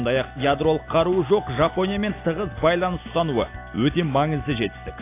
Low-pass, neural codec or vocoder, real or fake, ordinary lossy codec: 3.6 kHz; none; real; AAC, 24 kbps